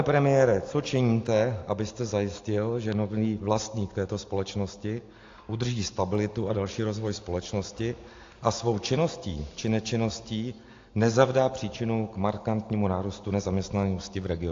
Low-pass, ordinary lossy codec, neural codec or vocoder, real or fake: 7.2 kHz; AAC, 48 kbps; none; real